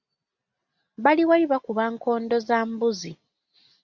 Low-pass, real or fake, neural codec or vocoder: 7.2 kHz; real; none